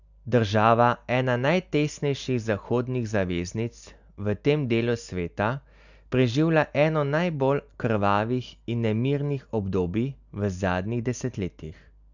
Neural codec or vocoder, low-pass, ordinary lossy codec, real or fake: none; 7.2 kHz; none; real